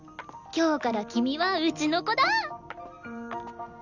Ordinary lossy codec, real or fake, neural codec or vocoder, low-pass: none; real; none; 7.2 kHz